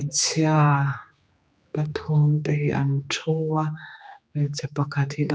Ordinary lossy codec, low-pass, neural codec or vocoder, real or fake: none; none; codec, 16 kHz, 2 kbps, X-Codec, HuBERT features, trained on general audio; fake